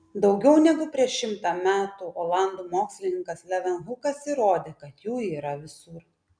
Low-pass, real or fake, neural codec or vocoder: 9.9 kHz; real; none